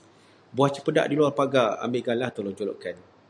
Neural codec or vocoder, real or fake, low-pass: none; real; 9.9 kHz